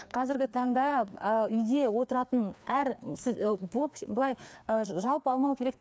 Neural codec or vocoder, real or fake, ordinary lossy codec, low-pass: codec, 16 kHz, 2 kbps, FreqCodec, larger model; fake; none; none